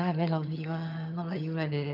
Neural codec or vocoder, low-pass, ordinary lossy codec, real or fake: vocoder, 22.05 kHz, 80 mel bands, HiFi-GAN; 5.4 kHz; none; fake